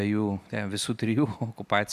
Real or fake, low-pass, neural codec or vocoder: fake; 14.4 kHz; vocoder, 44.1 kHz, 128 mel bands every 256 samples, BigVGAN v2